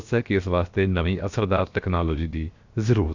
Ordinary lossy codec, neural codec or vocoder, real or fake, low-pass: Opus, 64 kbps; codec, 16 kHz, 0.7 kbps, FocalCodec; fake; 7.2 kHz